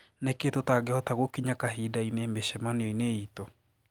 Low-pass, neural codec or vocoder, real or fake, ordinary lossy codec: 19.8 kHz; none; real; Opus, 32 kbps